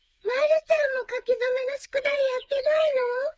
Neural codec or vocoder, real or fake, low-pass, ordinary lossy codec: codec, 16 kHz, 4 kbps, FreqCodec, smaller model; fake; none; none